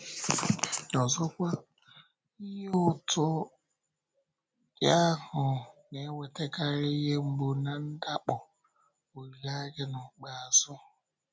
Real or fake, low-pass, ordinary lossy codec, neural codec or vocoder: real; none; none; none